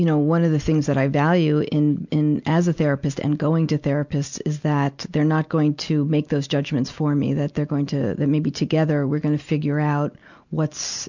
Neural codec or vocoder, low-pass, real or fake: none; 7.2 kHz; real